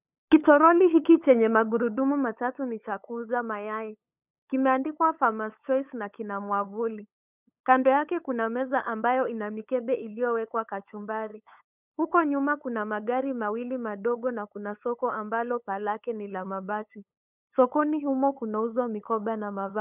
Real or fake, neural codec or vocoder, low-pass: fake; codec, 16 kHz, 8 kbps, FunCodec, trained on LibriTTS, 25 frames a second; 3.6 kHz